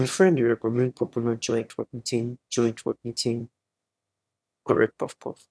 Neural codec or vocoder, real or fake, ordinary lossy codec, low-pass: autoencoder, 22.05 kHz, a latent of 192 numbers a frame, VITS, trained on one speaker; fake; none; none